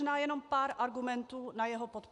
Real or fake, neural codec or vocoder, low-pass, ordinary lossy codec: real; none; 10.8 kHz; MP3, 96 kbps